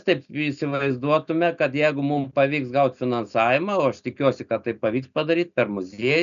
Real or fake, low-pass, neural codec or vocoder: real; 7.2 kHz; none